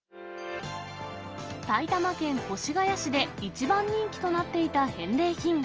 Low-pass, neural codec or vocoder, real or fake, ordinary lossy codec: 7.2 kHz; none; real; Opus, 24 kbps